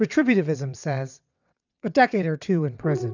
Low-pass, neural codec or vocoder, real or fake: 7.2 kHz; vocoder, 22.05 kHz, 80 mel bands, WaveNeXt; fake